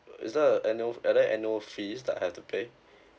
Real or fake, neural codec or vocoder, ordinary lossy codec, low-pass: real; none; none; none